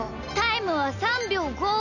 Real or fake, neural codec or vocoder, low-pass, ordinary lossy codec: real; none; 7.2 kHz; none